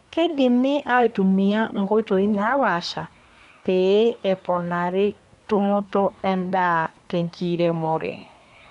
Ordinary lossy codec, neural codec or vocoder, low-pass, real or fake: none; codec, 24 kHz, 1 kbps, SNAC; 10.8 kHz; fake